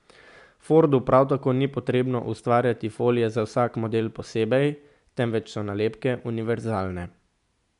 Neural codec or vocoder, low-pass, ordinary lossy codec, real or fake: none; 10.8 kHz; none; real